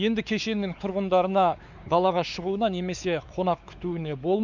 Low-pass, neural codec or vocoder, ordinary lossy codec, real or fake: 7.2 kHz; codec, 16 kHz, 4 kbps, X-Codec, WavLM features, trained on Multilingual LibriSpeech; none; fake